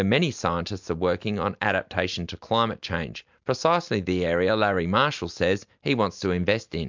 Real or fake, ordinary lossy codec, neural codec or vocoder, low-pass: real; MP3, 64 kbps; none; 7.2 kHz